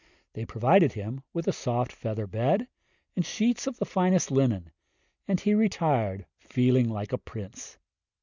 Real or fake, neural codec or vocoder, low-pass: real; none; 7.2 kHz